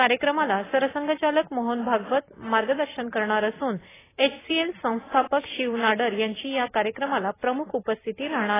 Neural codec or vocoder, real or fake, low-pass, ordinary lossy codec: none; real; 3.6 kHz; AAC, 16 kbps